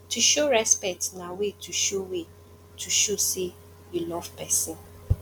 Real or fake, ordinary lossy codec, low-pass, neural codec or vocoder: real; none; 19.8 kHz; none